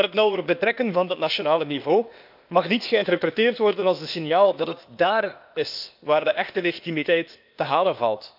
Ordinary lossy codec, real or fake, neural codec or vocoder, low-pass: none; fake; codec, 16 kHz, 0.8 kbps, ZipCodec; 5.4 kHz